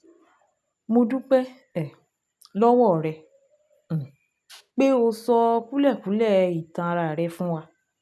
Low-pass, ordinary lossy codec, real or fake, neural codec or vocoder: none; none; real; none